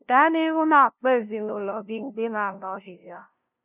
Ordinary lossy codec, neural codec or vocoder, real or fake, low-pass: none; codec, 16 kHz, 0.5 kbps, FunCodec, trained on LibriTTS, 25 frames a second; fake; 3.6 kHz